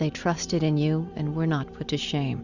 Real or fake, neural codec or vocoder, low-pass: real; none; 7.2 kHz